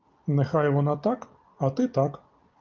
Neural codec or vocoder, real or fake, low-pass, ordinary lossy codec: none; real; 7.2 kHz; Opus, 24 kbps